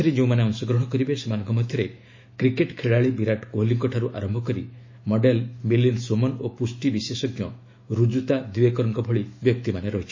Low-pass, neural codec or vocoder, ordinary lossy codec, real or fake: 7.2 kHz; autoencoder, 48 kHz, 128 numbers a frame, DAC-VAE, trained on Japanese speech; MP3, 32 kbps; fake